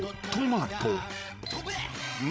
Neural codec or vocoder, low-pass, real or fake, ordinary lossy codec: codec, 16 kHz, 16 kbps, FreqCodec, larger model; none; fake; none